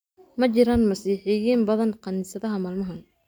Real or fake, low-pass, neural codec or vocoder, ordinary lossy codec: real; none; none; none